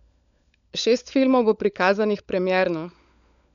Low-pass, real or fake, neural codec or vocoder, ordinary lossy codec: 7.2 kHz; fake; codec, 16 kHz, 16 kbps, FunCodec, trained on LibriTTS, 50 frames a second; none